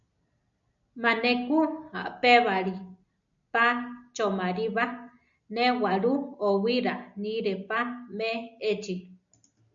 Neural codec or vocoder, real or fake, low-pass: none; real; 7.2 kHz